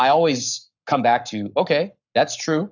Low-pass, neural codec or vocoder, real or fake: 7.2 kHz; codec, 44.1 kHz, 7.8 kbps, Pupu-Codec; fake